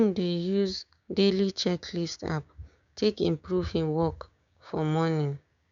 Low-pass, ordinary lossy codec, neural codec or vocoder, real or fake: 7.2 kHz; none; codec, 16 kHz, 6 kbps, DAC; fake